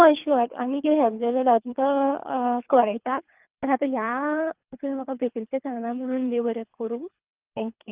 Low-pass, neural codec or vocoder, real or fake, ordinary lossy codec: 3.6 kHz; codec, 24 kHz, 3 kbps, HILCodec; fake; Opus, 32 kbps